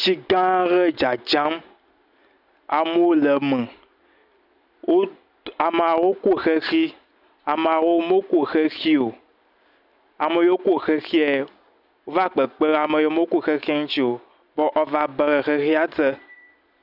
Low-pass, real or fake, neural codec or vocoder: 5.4 kHz; real; none